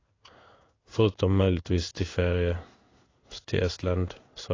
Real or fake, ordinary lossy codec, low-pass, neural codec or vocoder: fake; AAC, 32 kbps; 7.2 kHz; codec, 16 kHz in and 24 kHz out, 1 kbps, XY-Tokenizer